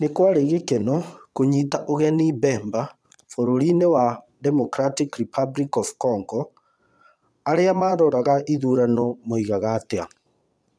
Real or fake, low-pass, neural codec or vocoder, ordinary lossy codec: fake; none; vocoder, 22.05 kHz, 80 mel bands, Vocos; none